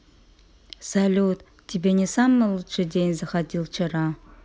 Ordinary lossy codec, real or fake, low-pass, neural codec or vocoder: none; real; none; none